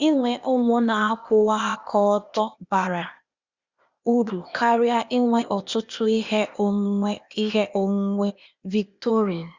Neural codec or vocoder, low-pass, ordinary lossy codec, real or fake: codec, 16 kHz, 0.8 kbps, ZipCodec; 7.2 kHz; Opus, 64 kbps; fake